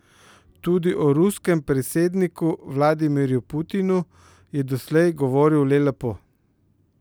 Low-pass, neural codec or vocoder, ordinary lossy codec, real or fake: none; none; none; real